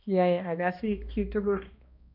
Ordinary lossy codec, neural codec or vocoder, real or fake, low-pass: none; codec, 16 kHz, 1 kbps, X-Codec, HuBERT features, trained on balanced general audio; fake; 5.4 kHz